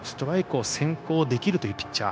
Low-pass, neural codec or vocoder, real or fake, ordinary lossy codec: none; codec, 16 kHz, 0.9 kbps, LongCat-Audio-Codec; fake; none